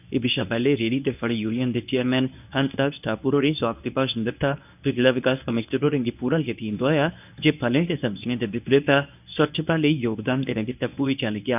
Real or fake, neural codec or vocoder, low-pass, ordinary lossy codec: fake; codec, 24 kHz, 0.9 kbps, WavTokenizer, medium speech release version 1; 3.6 kHz; none